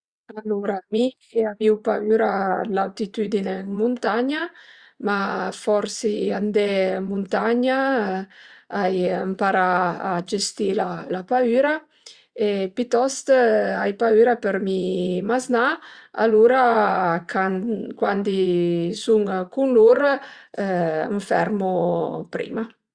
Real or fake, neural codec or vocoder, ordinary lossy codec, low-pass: fake; vocoder, 22.05 kHz, 80 mel bands, WaveNeXt; Opus, 64 kbps; 9.9 kHz